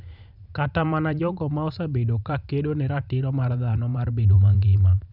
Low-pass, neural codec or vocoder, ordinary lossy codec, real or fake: 5.4 kHz; vocoder, 44.1 kHz, 128 mel bands every 512 samples, BigVGAN v2; none; fake